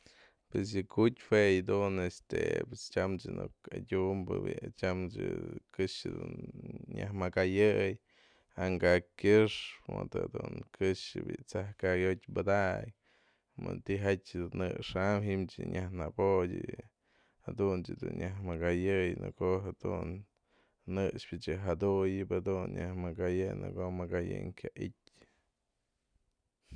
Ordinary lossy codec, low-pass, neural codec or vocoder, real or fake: none; 9.9 kHz; none; real